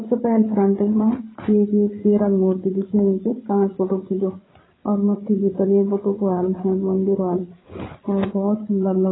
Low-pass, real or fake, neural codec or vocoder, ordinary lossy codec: 7.2 kHz; fake; codec, 16 kHz, 8 kbps, FreqCodec, larger model; AAC, 16 kbps